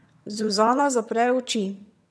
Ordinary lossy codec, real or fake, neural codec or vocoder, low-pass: none; fake; vocoder, 22.05 kHz, 80 mel bands, HiFi-GAN; none